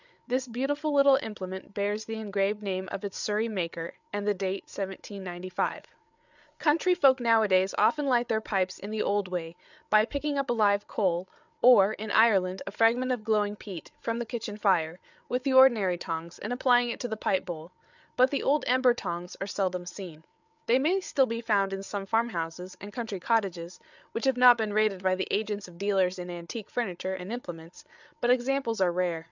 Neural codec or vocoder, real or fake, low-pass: codec, 16 kHz, 8 kbps, FreqCodec, larger model; fake; 7.2 kHz